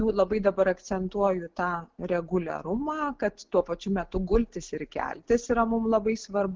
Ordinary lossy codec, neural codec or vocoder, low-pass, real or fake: Opus, 16 kbps; none; 7.2 kHz; real